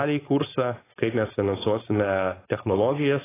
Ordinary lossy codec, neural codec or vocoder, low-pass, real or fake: AAC, 16 kbps; codec, 16 kHz, 4.8 kbps, FACodec; 3.6 kHz; fake